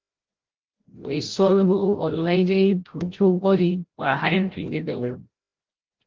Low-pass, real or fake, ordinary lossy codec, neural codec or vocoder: 7.2 kHz; fake; Opus, 16 kbps; codec, 16 kHz, 0.5 kbps, FreqCodec, larger model